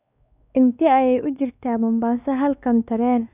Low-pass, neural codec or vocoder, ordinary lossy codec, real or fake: 3.6 kHz; codec, 16 kHz, 2 kbps, X-Codec, WavLM features, trained on Multilingual LibriSpeech; none; fake